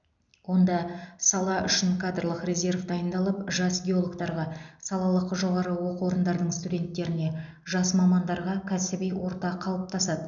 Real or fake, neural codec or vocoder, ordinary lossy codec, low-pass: real; none; none; 7.2 kHz